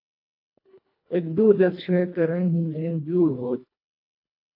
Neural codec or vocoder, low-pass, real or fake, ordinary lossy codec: codec, 24 kHz, 1.5 kbps, HILCodec; 5.4 kHz; fake; AAC, 32 kbps